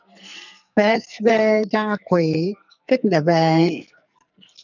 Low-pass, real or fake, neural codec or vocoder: 7.2 kHz; fake; codec, 44.1 kHz, 2.6 kbps, SNAC